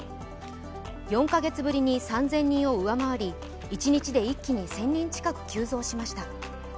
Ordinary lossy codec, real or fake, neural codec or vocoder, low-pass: none; real; none; none